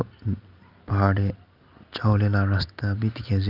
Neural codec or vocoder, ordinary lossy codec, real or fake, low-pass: none; Opus, 24 kbps; real; 5.4 kHz